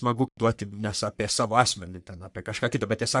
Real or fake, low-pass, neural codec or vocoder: fake; 10.8 kHz; codec, 44.1 kHz, 3.4 kbps, Pupu-Codec